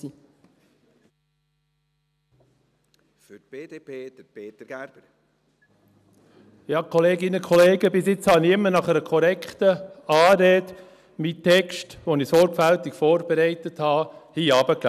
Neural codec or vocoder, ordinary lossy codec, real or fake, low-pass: none; none; real; 14.4 kHz